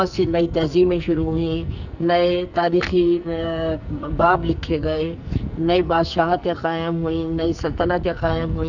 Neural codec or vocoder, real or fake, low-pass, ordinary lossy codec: codec, 44.1 kHz, 2.6 kbps, SNAC; fake; 7.2 kHz; none